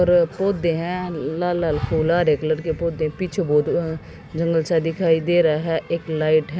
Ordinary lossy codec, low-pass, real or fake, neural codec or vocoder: none; none; real; none